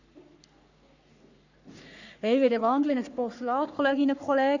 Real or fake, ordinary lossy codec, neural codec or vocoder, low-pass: fake; none; codec, 44.1 kHz, 3.4 kbps, Pupu-Codec; 7.2 kHz